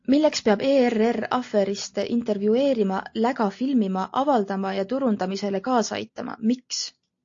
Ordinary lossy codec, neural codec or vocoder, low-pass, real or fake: AAC, 48 kbps; none; 7.2 kHz; real